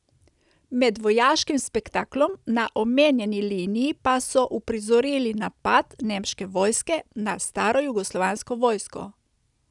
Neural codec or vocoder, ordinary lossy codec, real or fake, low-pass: none; none; real; 10.8 kHz